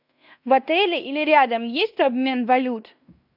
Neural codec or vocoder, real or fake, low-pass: codec, 16 kHz in and 24 kHz out, 0.9 kbps, LongCat-Audio-Codec, fine tuned four codebook decoder; fake; 5.4 kHz